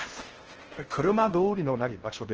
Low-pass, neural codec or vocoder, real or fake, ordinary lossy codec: 7.2 kHz; codec, 16 kHz in and 24 kHz out, 0.6 kbps, FocalCodec, streaming, 4096 codes; fake; Opus, 16 kbps